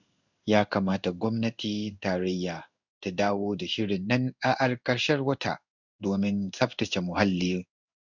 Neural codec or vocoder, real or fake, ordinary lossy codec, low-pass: codec, 16 kHz in and 24 kHz out, 1 kbps, XY-Tokenizer; fake; none; 7.2 kHz